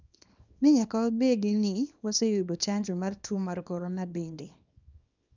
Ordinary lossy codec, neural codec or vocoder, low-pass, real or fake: none; codec, 24 kHz, 0.9 kbps, WavTokenizer, small release; 7.2 kHz; fake